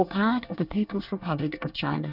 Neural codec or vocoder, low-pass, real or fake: codec, 24 kHz, 1 kbps, SNAC; 5.4 kHz; fake